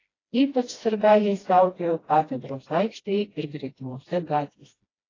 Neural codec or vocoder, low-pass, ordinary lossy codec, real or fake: codec, 16 kHz, 1 kbps, FreqCodec, smaller model; 7.2 kHz; AAC, 32 kbps; fake